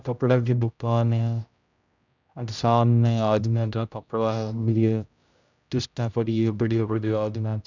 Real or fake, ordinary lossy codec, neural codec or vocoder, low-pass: fake; none; codec, 16 kHz, 0.5 kbps, X-Codec, HuBERT features, trained on general audio; 7.2 kHz